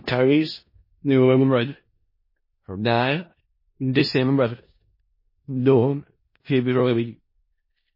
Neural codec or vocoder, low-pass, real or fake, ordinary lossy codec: codec, 16 kHz in and 24 kHz out, 0.4 kbps, LongCat-Audio-Codec, four codebook decoder; 5.4 kHz; fake; MP3, 24 kbps